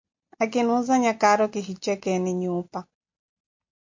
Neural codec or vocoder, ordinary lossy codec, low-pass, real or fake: none; MP3, 48 kbps; 7.2 kHz; real